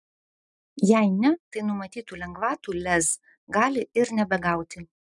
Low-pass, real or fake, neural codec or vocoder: 10.8 kHz; real; none